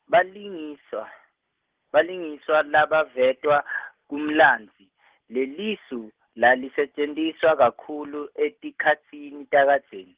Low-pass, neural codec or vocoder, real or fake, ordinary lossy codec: 3.6 kHz; none; real; Opus, 16 kbps